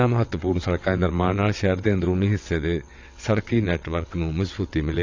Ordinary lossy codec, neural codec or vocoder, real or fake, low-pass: AAC, 48 kbps; vocoder, 22.05 kHz, 80 mel bands, WaveNeXt; fake; 7.2 kHz